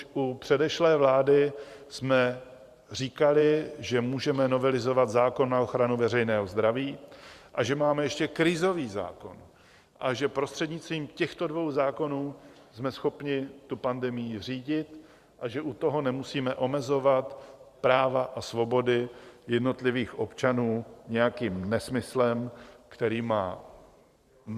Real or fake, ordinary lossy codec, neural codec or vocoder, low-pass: fake; Opus, 64 kbps; vocoder, 44.1 kHz, 128 mel bands every 512 samples, BigVGAN v2; 14.4 kHz